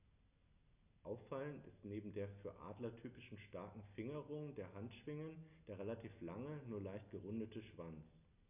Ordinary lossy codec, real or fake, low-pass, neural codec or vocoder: none; real; 3.6 kHz; none